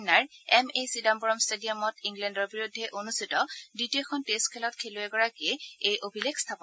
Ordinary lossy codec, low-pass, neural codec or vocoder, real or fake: none; none; none; real